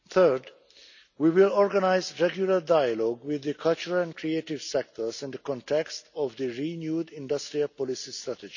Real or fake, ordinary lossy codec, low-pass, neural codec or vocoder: real; none; 7.2 kHz; none